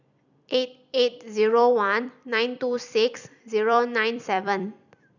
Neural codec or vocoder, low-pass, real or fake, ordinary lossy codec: vocoder, 44.1 kHz, 128 mel bands every 256 samples, BigVGAN v2; 7.2 kHz; fake; none